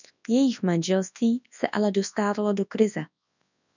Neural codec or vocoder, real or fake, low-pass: codec, 24 kHz, 0.9 kbps, WavTokenizer, large speech release; fake; 7.2 kHz